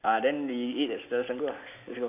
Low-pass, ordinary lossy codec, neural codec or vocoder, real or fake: 3.6 kHz; none; none; real